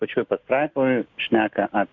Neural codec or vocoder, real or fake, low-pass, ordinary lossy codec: none; real; 7.2 kHz; MP3, 64 kbps